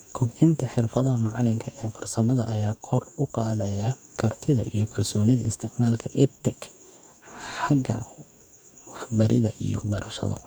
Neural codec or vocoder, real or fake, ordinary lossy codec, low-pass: codec, 44.1 kHz, 2.6 kbps, DAC; fake; none; none